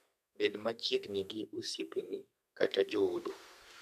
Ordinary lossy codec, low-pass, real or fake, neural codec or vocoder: none; 14.4 kHz; fake; codec, 32 kHz, 1.9 kbps, SNAC